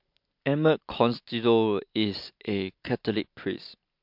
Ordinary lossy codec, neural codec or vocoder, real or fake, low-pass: MP3, 48 kbps; none; real; 5.4 kHz